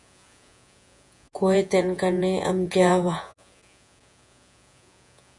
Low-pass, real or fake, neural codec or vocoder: 10.8 kHz; fake; vocoder, 48 kHz, 128 mel bands, Vocos